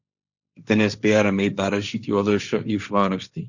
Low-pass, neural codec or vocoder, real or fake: 7.2 kHz; codec, 16 kHz, 1.1 kbps, Voila-Tokenizer; fake